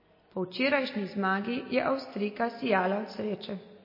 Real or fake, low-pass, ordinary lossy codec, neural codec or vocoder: real; 5.4 kHz; MP3, 24 kbps; none